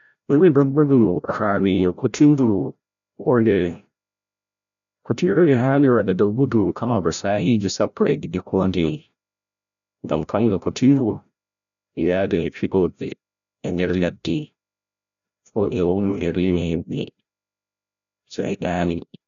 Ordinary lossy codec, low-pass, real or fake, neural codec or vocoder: none; 7.2 kHz; fake; codec, 16 kHz, 0.5 kbps, FreqCodec, larger model